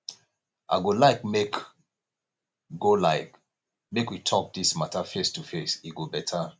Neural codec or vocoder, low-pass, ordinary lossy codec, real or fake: none; none; none; real